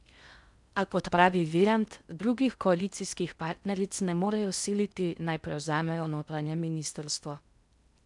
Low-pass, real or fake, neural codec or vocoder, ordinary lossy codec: 10.8 kHz; fake; codec, 16 kHz in and 24 kHz out, 0.6 kbps, FocalCodec, streaming, 4096 codes; none